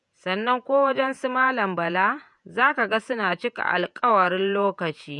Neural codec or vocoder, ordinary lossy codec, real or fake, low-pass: vocoder, 48 kHz, 128 mel bands, Vocos; none; fake; 10.8 kHz